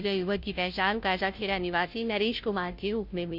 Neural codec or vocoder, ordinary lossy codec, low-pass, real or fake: codec, 16 kHz, 0.5 kbps, FunCodec, trained on Chinese and English, 25 frames a second; MP3, 48 kbps; 5.4 kHz; fake